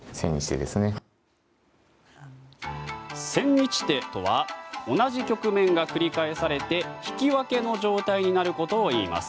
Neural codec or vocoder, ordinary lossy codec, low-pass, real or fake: none; none; none; real